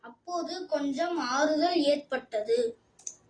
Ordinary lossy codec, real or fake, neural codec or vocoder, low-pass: AAC, 32 kbps; real; none; 9.9 kHz